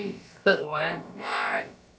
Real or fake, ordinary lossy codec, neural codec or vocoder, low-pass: fake; none; codec, 16 kHz, about 1 kbps, DyCAST, with the encoder's durations; none